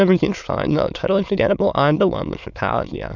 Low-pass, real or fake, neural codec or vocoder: 7.2 kHz; fake; autoencoder, 22.05 kHz, a latent of 192 numbers a frame, VITS, trained on many speakers